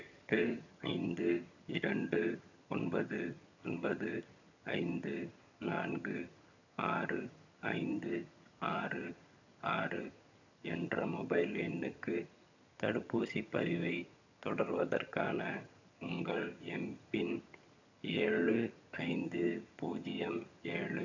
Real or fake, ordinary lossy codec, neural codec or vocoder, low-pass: fake; none; vocoder, 22.05 kHz, 80 mel bands, HiFi-GAN; 7.2 kHz